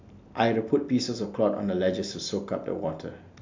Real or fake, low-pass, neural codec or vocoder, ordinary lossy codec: real; 7.2 kHz; none; MP3, 64 kbps